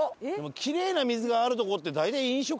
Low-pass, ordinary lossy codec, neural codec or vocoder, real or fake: none; none; none; real